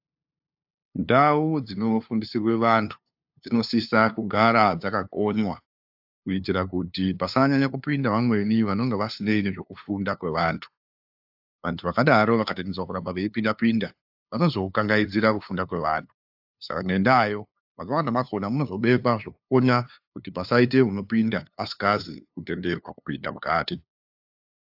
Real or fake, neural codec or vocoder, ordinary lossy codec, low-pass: fake; codec, 16 kHz, 2 kbps, FunCodec, trained on LibriTTS, 25 frames a second; AAC, 48 kbps; 5.4 kHz